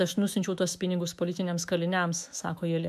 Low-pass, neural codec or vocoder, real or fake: 14.4 kHz; autoencoder, 48 kHz, 128 numbers a frame, DAC-VAE, trained on Japanese speech; fake